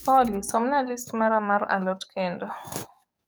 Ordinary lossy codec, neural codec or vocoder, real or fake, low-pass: none; codec, 44.1 kHz, 7.8 kbps, DAC; fake; none